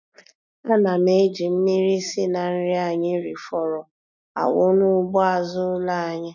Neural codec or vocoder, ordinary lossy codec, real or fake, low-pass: none; none; real; 7.2 kHz